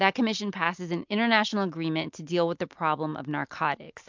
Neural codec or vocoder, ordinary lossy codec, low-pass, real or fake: none; MP3, 64 kbps; 7.2 kHz; real